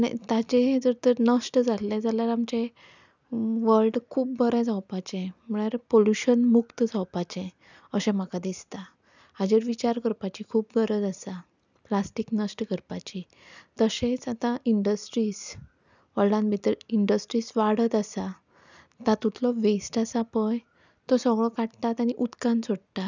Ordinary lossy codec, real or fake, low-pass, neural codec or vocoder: none; real; 7.2 kHz; none